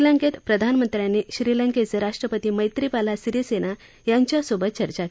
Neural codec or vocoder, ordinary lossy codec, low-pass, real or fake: none; none; none; real